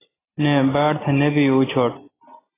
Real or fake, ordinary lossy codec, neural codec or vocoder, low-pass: real; AAC, 16 kbps; none; 3.6 kHz